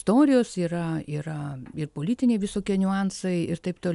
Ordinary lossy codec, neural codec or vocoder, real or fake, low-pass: MP3, 96 kbps; none; real; 10.8 kHz